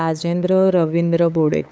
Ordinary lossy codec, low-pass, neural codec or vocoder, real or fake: none; none; codec, 16 kHz, 8 kbps, FunCodec, trained on LibriTTS, 25 frames a second; fake